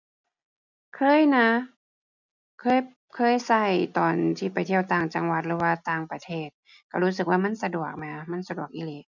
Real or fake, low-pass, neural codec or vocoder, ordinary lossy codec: real; 7.2 kHz; none; none